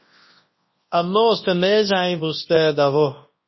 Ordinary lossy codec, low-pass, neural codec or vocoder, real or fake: MP3, 24 kbps; 7.2 kHz; codec, 24 kHz, 0.9 kbps, WavTokenizer, large speech release; fake